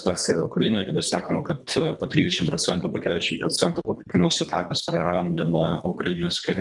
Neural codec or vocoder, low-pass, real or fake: codec, 24 kHz, 1.5 kbps, HILCodec; 10.8 kHz; fake